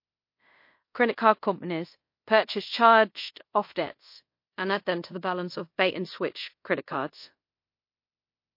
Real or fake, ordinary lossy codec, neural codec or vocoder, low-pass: fake; MP3, 32 kbps; codec, 24 kHz, 0.5 kbps, DualCodec; 5.4 kHz